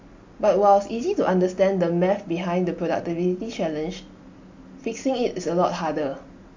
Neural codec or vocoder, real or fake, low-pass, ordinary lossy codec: none; real; 7.2 kHz; AAC, 48 kbps